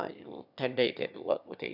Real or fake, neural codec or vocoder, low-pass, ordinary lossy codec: fake; autoencoder, 22.05 kHz, a latent of 192 numbers a frame, VITS, trained on one speaker; 5.4 kHz; none